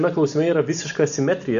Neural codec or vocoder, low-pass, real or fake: none; 7.2 kHz; real